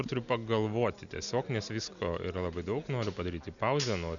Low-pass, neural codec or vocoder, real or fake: 7.2 kHz; none; real